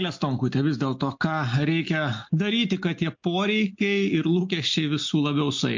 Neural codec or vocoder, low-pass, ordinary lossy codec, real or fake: none; 7.2 kHz; MP3, 48 kbps; real